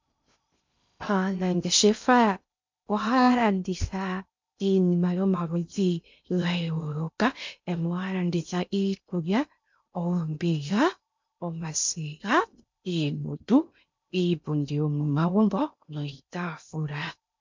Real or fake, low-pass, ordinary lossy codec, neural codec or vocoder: fake; 7.2 kHz; MP3, 64 kbps; codec, 16 kHz in and 24 kHz out, 0.6 kbps, FocalCodec, streaming, 2048 codes